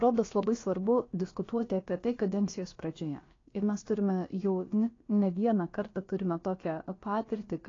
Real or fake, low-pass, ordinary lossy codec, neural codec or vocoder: fake; 7.2 kHz; AAC, 32 kbps; codec, 16 kHz, about 1 kbps, DyCAST, with the encoder's durations